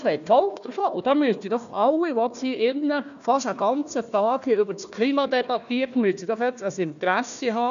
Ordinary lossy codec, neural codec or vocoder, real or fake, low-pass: none; codec, 16 kHz, 1 kbps, FunCodec, trained on Chinese and English, 50 frames a second; fake; 7.2 kHz